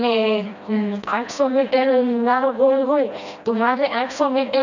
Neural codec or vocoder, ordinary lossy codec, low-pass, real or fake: codec, 16 kHz, 1 kbps, FreqCodec, smaller model; none; 7.2 kHz; fake